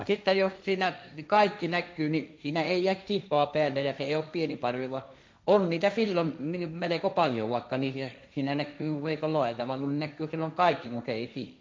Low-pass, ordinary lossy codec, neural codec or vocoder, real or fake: none; none; codec, 16 kHz, 1.1 kbps, Voila-Tokenizer; fake